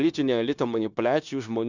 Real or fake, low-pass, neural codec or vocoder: fake; 7.2 kHz; codec, 16 kHz, 0.9 kbps, LongCat-Audio-Codec